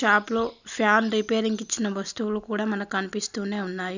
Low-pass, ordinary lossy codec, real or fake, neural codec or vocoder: 7.2 kHz; none; real; none